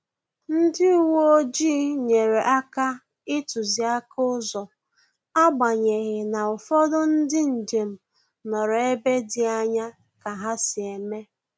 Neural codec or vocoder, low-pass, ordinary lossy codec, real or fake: none; none; none; real